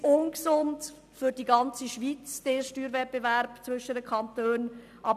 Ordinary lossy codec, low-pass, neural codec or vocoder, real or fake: none; 14.4 kHz; none; real